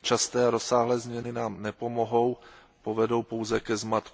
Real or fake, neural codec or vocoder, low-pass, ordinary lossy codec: real; none; none; none